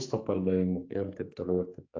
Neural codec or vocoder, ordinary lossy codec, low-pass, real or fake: codec, 44.1 kHz, 2.6 kbps, SNAC; AAC, 32 kbps; 7.2 kHz; fake